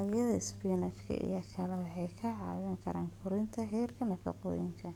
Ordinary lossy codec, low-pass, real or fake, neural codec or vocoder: none; 19.8 kHz; fake; codec, 44.1 kHz, 7.8 kbps, DAC